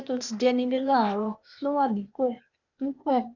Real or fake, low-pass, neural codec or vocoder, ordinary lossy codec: fake; 7.2 kHz; codec, 16 kHz, 0.8 kbps, ZipCodec; none